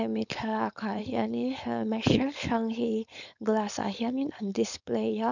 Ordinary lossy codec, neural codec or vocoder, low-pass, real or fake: none; codec, 16 kHz, 4.8 kbps, FACodec; 7.2 kHz; fake